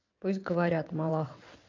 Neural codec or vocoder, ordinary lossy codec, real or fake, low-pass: vocoder, 22.05 kHz, 80 mel bands, WaveNeXt; AAC, 48 kbps; fake; 7.2 kHz